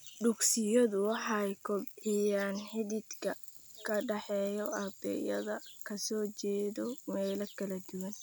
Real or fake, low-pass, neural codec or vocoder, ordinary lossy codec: real; none; none; none